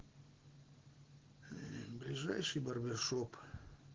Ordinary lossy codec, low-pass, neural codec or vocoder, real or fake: Opus, 16 kbps; 7.2 kHz; none; real